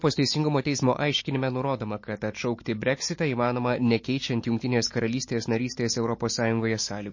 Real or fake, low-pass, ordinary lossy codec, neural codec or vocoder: real; 7.2 kHz; MP3, 32 kbps; none